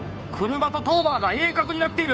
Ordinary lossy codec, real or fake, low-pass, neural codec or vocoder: none; fake; none; codec, 16 kHz, 2 kbps, FunCodec, trained on Chinese and English, 25 frames a second